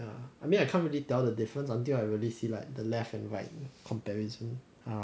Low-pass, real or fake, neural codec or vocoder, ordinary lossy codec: none; real; none; none